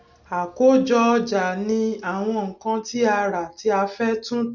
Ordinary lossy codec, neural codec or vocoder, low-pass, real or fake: none; none; 7.2 kHz; real